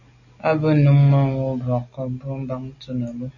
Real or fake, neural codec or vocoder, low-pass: real; none; 7.2 kHz